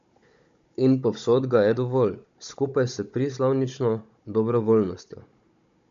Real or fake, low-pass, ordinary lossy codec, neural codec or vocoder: fake; 7.2 kHz; MP3, 48 kbps; codec, 16 kHz, 16 kbps, FunCodec, trained on Chinese and English, 50 frames a second